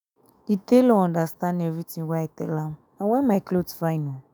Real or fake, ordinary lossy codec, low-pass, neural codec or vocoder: fake; none; none; autoencoder, 48 kHz, 128 numbers a frame, DAC-VAE, trained on Japanese speech